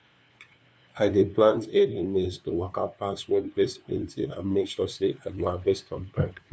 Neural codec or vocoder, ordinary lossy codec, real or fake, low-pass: codec, 16 kHz, 2 kbps, FunCodec, trained on LibriTTS, 25 frames a second; none; fake; none